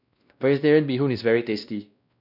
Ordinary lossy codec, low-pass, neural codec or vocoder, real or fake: none; 5.4 kHz; codec, 16 kHz, 1 kbps, X-Codec, WavLM features, trained on Multilingual LibriSpeech; fake